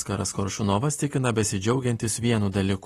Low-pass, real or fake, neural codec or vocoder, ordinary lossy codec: 19.8 kHz; real; none; AAC, 32 kbps